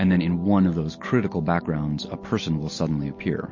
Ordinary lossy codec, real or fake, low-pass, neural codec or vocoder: MP3, 32 kbps; real; 7.2 kHz; none